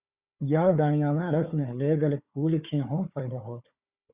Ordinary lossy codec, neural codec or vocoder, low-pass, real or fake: Opus, 64 kbps; codec, 16 kHz, 4 kbps, FunCodec, trained on Chinese and English, 50 frames a second; 3.6 kHz; fake